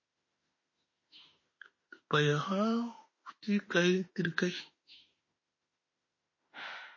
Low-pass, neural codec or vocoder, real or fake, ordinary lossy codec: 7.2 kHz; autoencoder, 48 kHz, 32 numbers a frame, DAC-VAE, trained on Japanese speech; fake; MP3, 32 kbps